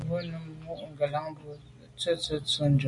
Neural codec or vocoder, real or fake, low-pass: none; real; 10.8 kHz